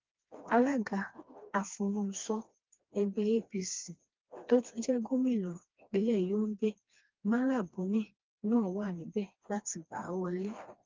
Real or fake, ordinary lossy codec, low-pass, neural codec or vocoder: fake; Opus, 32 kbps; 7.2 kHz; codec, 16 kHz, 2 kbps, FreqCodec, smaller model